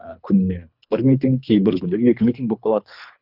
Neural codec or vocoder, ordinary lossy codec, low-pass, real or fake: codec, 24 kHz, 3 kbps, HILCodec; none; 5.4 kHz; fake